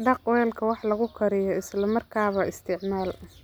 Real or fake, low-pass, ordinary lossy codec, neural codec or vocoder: real; none; none; none